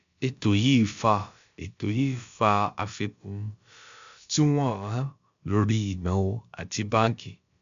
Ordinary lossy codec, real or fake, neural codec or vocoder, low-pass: MP3, 64 kbps; fake; codec, 16 kHz, about 1 kbps, DyCAST, with the encoder's durations; 7.2 kHz